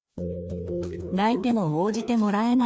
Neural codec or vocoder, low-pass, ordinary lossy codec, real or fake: codec, 16 kHz, 2 kbps, FreqCodec, larger model; none; none; fake